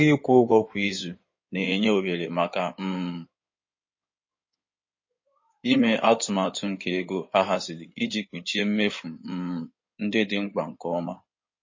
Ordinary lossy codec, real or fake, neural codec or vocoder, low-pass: MP3, 32 kbps; fake; codec, 16 kHz in and 24 kHz out, 2.2 kbps, FireRedTTS-2 codec; 7.2 kHz